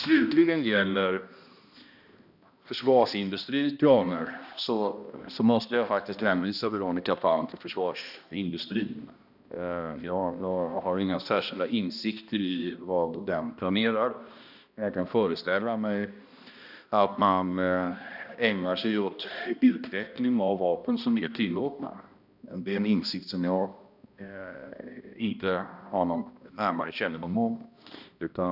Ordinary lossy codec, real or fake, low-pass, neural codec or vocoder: none; fake; 5.4 kHz; codec, 16 kHz, 1 kbps, X-Codec, HuBERT features, trained on balanced general audio